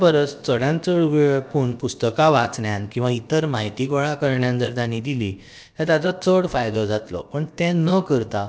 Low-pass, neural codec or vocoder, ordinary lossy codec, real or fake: none; codec, 16 kHz, about 1 kbps, DyCAST, with the encoder's durations; none; fake